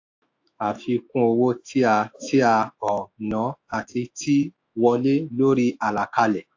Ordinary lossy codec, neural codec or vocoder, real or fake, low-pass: AAC, 48 kbps; autoencoder, 48 kHz, 128 numbers a frame, DAC-VAE, trained on Japanese speech; fake; 7.2 kHz